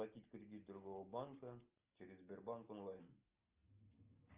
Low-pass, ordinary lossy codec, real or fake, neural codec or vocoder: 3.6 kHz; Opus, 32 kbps; real; none